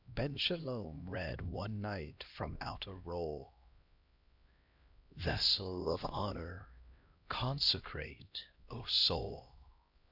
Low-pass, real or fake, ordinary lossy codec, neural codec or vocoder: 5.4 kHz; fake; Opus, 64 kbps; codec, 16 kHz, 1 kbps, X-Codec, HuBERT features, trained on LibriSpeech